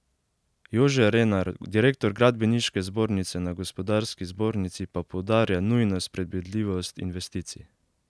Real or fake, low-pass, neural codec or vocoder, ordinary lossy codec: real; none; none; none